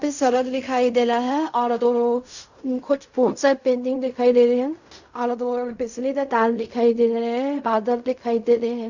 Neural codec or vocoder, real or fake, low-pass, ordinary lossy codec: codec, 16 kHz in and 24 kHz out, 0.4 kbps, LongCat-Audio-Codec, fine tuned four codebook decoder; fake; 7.2 kHz; none